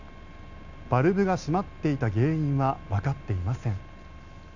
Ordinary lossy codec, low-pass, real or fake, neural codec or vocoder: none; 7.2 kHz; real; none